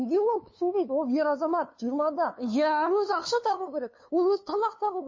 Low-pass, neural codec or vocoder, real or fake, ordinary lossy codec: 7.2 kHz; codec, 16 kHz, 2 kbps, FunCodec, trained on LibriTTS, 25 frames a second; fake; MP3, 32 kbps